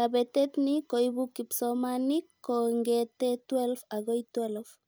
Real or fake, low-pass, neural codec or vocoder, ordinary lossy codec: real; none; none; none